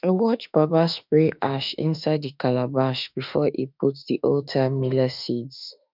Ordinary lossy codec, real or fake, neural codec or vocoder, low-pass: none; fake; autoencoder, 48 kHz, 32 numbers a frame, DAC-VAE, trained on Japanese speech; 5.4 kHz